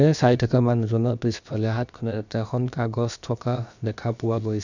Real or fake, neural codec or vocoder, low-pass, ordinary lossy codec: fake; codec, 16 kHz, about 1 kbps, DyCAST, with the encoder's durations; 7.2 kHz; none